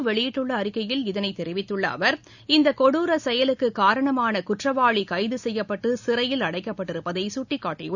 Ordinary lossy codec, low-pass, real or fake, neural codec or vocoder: none; 7.2 kHz; real; none